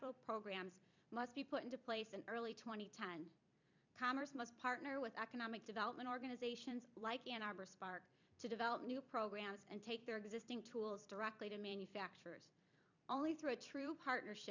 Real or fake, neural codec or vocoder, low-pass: fake; vocoder, 44.1 kHz, 128 mel bands every 256 samples, BigVGAN v2; 7.2 kHz